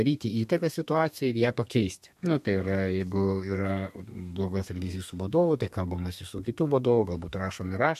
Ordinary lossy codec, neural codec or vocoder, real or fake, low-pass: MP3, 64 kbps; codec, 32 kHz, 1.9 kbps, SNAC; fake; 14.4 kHz